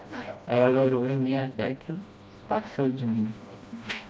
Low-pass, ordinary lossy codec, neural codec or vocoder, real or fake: none; none; codec, 16 kHz, 1 kbps, FreqCodec, smaller model; fake